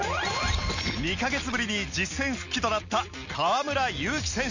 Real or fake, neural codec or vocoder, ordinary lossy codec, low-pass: real; none; none; 7.2 kHz